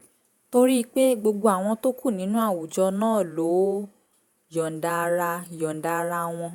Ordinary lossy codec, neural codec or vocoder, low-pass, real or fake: none; vocoder, 48 kHz, 128 mel bands, Vocos; none; fake